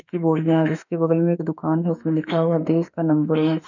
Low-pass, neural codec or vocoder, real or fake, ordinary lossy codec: 7.2 kHz; autoencoder, 48 kHz, 32 numbers a frame, DAC-VAE, trained on Japanese speech; fake; none